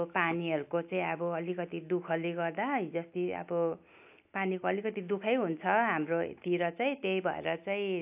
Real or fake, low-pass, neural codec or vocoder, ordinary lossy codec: real; 3.6 kHz; none; AAC, 32 kbps